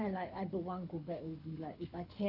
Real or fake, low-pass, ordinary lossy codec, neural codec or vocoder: fake; 5.4 kHz; none; codec, 24 kHz, 6 kbps, HILCodec